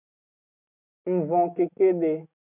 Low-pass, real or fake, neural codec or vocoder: 3.6 kHz; real; none